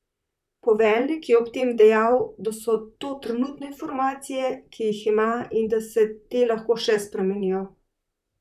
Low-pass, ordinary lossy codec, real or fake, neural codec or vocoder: 14.4 kHz; none; fake; vocoder, 44.1 kHz, 128 mel bands, Pupu-Vocoder